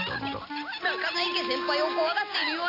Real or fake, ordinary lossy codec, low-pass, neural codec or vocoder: real; AAC, 32 kbps; 5.4 kHz; none